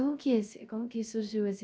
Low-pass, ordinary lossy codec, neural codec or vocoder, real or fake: none; none; codec, 16 kHz, 0.3 kbps, FocalCodec; fake